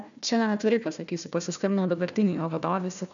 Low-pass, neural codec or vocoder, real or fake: 7.2 kHz; codec, 16 kHz, 1 kbps, FunCodec, trained on Chinese and English, 50 frames a second; fake